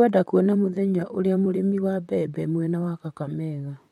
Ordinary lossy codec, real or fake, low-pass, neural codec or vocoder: MP3, 64 kbps; fake; 19.8 kHz; vocoder, 44.1 kHz, 128 mel bands, Pupu-Vocoder